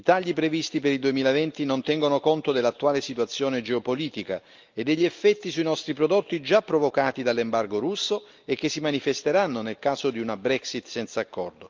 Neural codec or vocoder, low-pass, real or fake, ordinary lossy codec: none; 7.2 kHz; real; Opus, 24 kbps